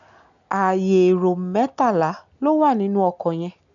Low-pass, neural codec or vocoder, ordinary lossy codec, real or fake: 7.2 kHz; none; none; real